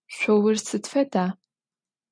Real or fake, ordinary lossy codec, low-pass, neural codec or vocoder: real; MP3, 64 kbps; 9.9 kHz; none